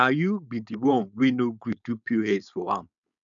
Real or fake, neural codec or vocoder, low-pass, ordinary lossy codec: fake; codec, 16 kHz, 4.8 kbps, FACodec; 7.2 kHz; none